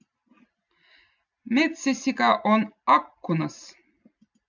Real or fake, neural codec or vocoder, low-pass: real; none; 7.2 kHz